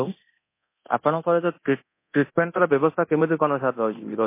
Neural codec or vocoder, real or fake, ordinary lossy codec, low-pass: codec, 24 kHz, 0.9 kbps, DualCodec; fake; MP3, 24 kbps; 3.6 kHz